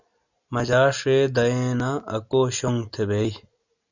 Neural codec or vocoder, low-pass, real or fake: none; 7.2 kHz; real